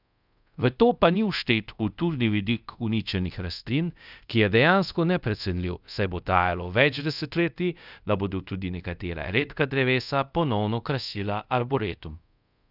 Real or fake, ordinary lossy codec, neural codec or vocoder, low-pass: fake; none; codec, 24 kHz, 0.5 kbps, DualCodec; 5.4 kHz